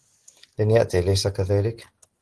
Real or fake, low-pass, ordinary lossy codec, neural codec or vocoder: real; 10.8 kHz; Opus, 16 kbps; none